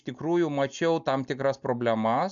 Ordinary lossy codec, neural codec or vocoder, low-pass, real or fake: MP3, 96 kbps; none; 7.2 kHz; real